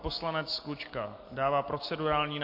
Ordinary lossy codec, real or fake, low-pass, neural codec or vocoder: MP3, 32 kbps; real; 5.4 kHz; none